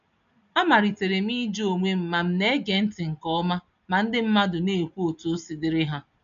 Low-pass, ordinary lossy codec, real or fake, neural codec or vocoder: 7.2 kHz; none; real; none